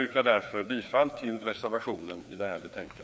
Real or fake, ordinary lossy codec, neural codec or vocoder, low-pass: fake; none; codec, 16 kHz, 2 kbps, FreqCodec, larger model; none